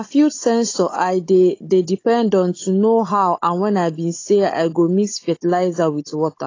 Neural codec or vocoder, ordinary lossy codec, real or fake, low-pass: codec, 16 kHz, 16 kbps, FunCodec, trained on Chinese and English, 50 frames a second; AAC, 32 kbps; fake; 7.2 kHz